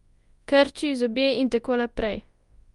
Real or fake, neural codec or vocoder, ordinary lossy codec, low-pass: fake; codec, 24 kHz, 0.9 kbps, WavTokenizer, large speech release; Opus, 32 kbps; 10.8 kHz